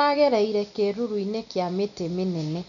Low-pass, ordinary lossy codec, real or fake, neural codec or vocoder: 7.2 kHz; none; real; none